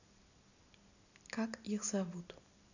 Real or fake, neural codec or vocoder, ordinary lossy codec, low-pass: real; none; none; 7.2 kHz